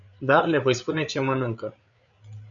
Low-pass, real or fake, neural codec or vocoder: 7.2 kHz; fake; codec, 16 kHz, 8 kbps, FreqCodec, larger model